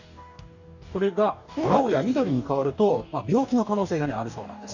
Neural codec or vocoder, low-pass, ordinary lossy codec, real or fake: codec, 44.1 kHz, 2.6 kbps, DAC; 7.2 kHz; none; fake